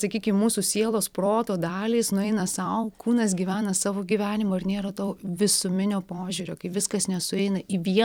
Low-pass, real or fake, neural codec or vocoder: 19.8 kHz; fake; vocoder, 44.1 kHz, 128 mel bands every 256 samples, BigVGAN v2